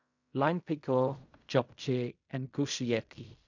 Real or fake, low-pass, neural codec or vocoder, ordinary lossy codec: fake; 7.2 kHz; codec, 16 kHz in and 24 kHz out, 0.4 kbps, LongCat-Audio-Codec, fine tuned four codebook decoder; none